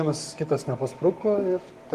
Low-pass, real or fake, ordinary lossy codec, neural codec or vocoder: 14.4 kHz; fake; Opus, 24 kbps; codec, 44.1 kHz, 7.8 kbps, Pupu-Codec